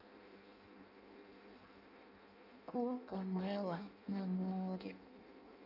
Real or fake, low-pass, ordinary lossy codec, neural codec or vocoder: fake; 5.4 kHz; none; codec, 16 kHz in and 24 kHz out, 0.6 kbps, FireRedTTS-2 codec